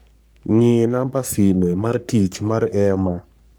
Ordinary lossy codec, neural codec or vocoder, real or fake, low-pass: none; codec, 44.1 kHz, 3.4 kbps, Pupu-Codec; fake; none